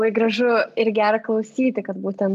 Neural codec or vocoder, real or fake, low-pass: none; real; 14.4 kHz